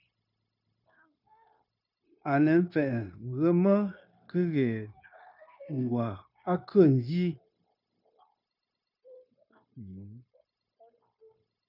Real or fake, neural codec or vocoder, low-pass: fake; codec, 16 kHz, 0.9 kbps, LongCat-Audio-Codec; 5.4 kHz